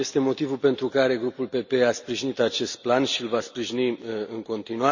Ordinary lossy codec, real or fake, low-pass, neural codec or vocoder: none; real; 7.2 kHz; none